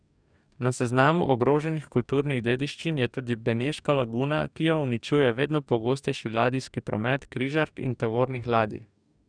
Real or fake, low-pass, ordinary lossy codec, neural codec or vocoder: fake; 9.9 kHz; none; codec, 44.1 kHz, 2.6 kbps, DAC